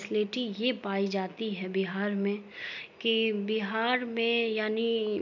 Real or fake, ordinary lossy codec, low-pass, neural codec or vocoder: real; none; 7.2 kHz; none